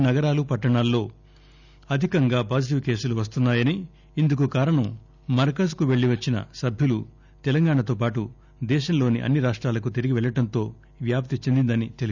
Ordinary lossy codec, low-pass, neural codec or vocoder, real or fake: none; 7.2 kHz; none; real